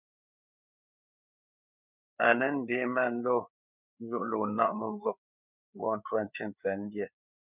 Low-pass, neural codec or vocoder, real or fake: 3.6 kHz; codec, 16 kHz, 4 kbps, FreqCodec, larger model; fake